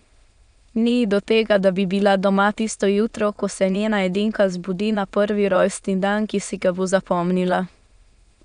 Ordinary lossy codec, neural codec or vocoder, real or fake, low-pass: none; autoencoder, 22.05 kHz, a latent of 192 numbers a frame, VITS, trained on many speakers; fake; 9.9 kHz